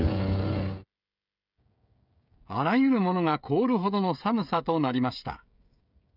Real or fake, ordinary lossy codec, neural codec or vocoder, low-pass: fake; none; codec, 16 kHz, 16 kbps, FreqCodec, smaller model; 5.4 kHz